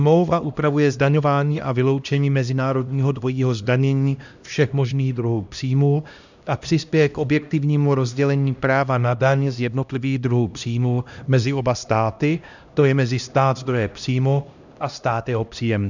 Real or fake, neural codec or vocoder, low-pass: fake; codec, 16 kHz, 1 kbps, X-Codec, HuBERT features, trained on LibriSpeech; 7.2 kHz